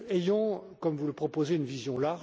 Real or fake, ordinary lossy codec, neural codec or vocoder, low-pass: real; none; none; none